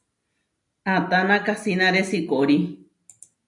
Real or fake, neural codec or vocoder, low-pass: real; none; 10.8 kHz